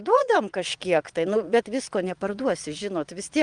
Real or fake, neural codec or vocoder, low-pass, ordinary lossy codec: fake; vocoder, 22.05 kHz, 80 mel bands, Vocos; 9.9 kHz; Opus, 32 kbps